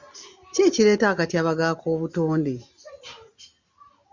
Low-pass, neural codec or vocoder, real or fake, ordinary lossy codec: 7.2 kHz; none; real; Opus, 64 kbps